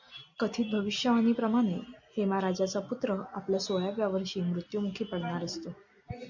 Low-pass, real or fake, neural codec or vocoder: 7.2 kHz; real; none